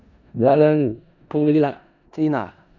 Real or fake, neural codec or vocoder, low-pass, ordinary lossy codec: fake; codec, 16 kHz in and 24 kHz out, 0.4 kbps, LongCat-Audio-Codec, four codebook decoder; 7.2 kHz; none